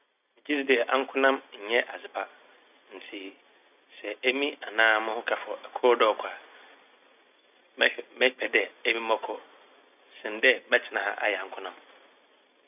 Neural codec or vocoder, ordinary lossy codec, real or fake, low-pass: none; none; real; 3.6 kHz